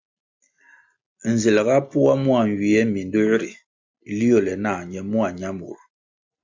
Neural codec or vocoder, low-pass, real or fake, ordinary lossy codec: none; 7.2 kHz; real; MP3, 64 kbps